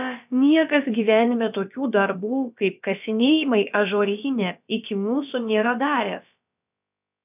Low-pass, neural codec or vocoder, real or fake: 3.6 kHz; codec, 16 kHz, about 1 kbps, DyCAST, with the encoder's durations; fake